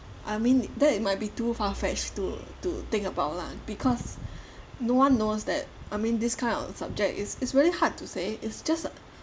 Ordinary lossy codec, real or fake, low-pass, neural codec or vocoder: none; real; none; none